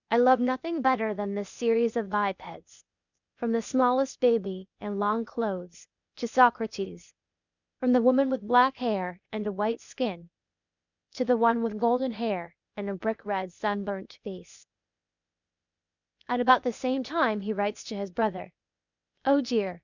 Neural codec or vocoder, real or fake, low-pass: codec, 16 kHz, 0.8 kbps, ZipCodec; fake; 7.2 kHz